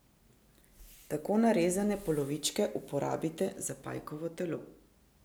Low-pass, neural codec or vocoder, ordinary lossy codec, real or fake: none; none; none; real